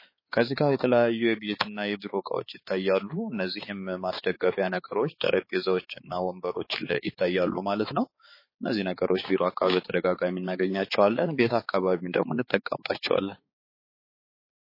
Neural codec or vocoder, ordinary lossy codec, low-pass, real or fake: codec, 16 kHz, 4 kbps, X-Codec, HuBERT features, trained on balanced general audio; MP3, 24 kbps; 5.4 kHz; fake